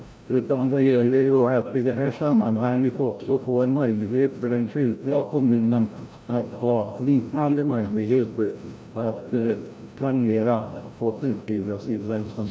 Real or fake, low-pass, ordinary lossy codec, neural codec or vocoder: fake; none; none; codec, 16 kHz, 0.5 kbps, FreqCodec, larger model